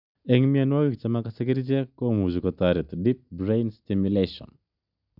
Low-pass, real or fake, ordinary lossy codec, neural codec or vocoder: 5.4 kHz; real; none; none